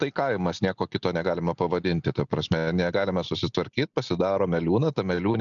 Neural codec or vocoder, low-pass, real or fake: none; 7.2 kHz; real